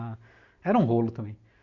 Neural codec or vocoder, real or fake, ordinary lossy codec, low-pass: none; real; none; 7.2 kHz